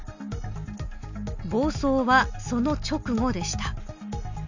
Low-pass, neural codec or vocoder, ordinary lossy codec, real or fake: 7.2 kHz; none; none; real